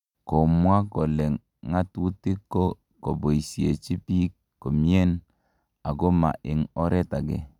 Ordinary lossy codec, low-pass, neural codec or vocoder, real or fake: none; 19.8 kHz; none; real